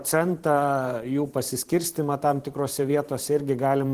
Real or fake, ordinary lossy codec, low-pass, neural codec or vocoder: fake; Opus, 16 kbps; 14.4 kHz; vocoder, 44.1 kHz, 128 mel bands every 512 samples, BigVGAN v2